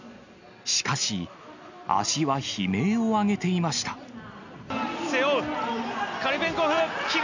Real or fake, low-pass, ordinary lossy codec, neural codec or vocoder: real; 7.2 kHz; none; none